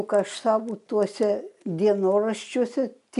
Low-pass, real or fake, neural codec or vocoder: 10.8 kHz; real; none